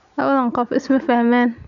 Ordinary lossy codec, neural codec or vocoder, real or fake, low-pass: none; none; real; 7.2 kHz